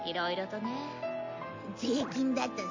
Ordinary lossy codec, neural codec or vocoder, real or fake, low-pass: MP3, 48 kbps; none; real; 7.2 kHz